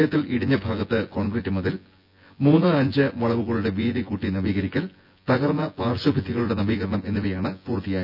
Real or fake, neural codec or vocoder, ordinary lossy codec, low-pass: fake; vocoder, 24 kHz, 100 mel bands, Vocos; none; 5.4 kHz